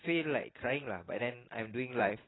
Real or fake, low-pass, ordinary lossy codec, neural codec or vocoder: fake; 7.2 kHz; AAC, 16 kbps; vocoder, 44.1 kHz, 128 mel bands every 256 samples, BigVGAN v2